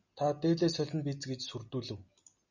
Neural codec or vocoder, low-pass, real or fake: none; 7.2 kHz; real